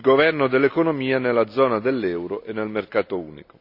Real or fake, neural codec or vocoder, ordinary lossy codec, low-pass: real; none; none; 5.4 kHz